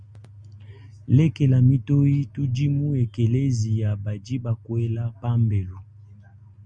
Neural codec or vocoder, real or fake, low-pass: none; real; 9.9 kHz